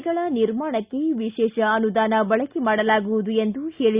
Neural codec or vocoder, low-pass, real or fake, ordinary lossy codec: none; 3.6 kHz; real; Opus, 64 kbps